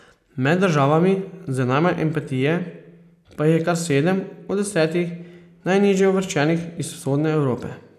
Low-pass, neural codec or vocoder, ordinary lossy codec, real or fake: 14.4 kHz; none; none; real